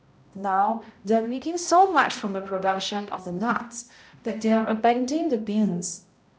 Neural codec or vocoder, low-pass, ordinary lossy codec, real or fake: codec, 16 kHz, 0.5 kbps, X-Codec, HuBERT features, trained on balanced general audio; none; none; fake